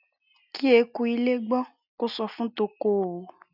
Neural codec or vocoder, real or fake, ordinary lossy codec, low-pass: none; real; none; 5.4 kHz